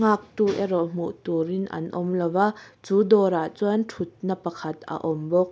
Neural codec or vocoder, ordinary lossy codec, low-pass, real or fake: none; none; none; real